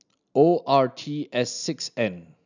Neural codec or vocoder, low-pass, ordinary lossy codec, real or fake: none; 7.2 kHz; none; real